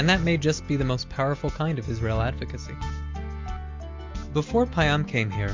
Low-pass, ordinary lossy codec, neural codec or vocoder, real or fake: 7.2 kHz; MP3, 64 kbps; none; real